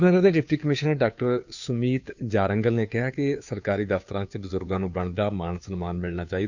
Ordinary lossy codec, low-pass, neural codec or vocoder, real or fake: none; 7.2 kHz; codec, 16 kHz, 2 kbps, FunCodec, trained on Chinese and English, 25 frames a second; fake